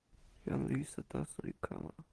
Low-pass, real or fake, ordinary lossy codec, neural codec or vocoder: 10.8 kHz; real; Opus, 16 kbps; none